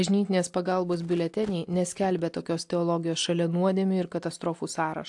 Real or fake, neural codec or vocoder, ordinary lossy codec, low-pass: real; none; AAC, 64 kbps; 10.8 kHz